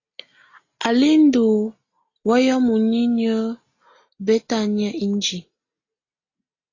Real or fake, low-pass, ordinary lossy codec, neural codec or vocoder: real; 7.2 kHz; AAC, 32 kbps; none